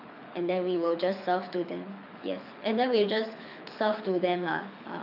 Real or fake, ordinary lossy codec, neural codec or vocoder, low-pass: fake; AAC, 48 kbps; codec, 24 kHz, 6 kbps, HILCodec; 5.4 kHz